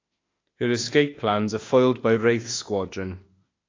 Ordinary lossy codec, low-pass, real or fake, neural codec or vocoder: AAC, 32 kbps; 7.2 kHz; fake; autoencoder, 48 kHz, 32 numbers a frame, DAC-VAE, trained on Japanese speech